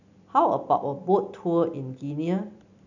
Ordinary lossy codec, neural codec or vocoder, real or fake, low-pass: none; none; real; 7.2 kHz